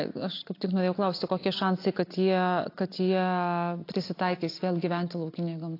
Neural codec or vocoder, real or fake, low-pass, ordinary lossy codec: none; real; 5.4 kHz; AAC, 32 kbps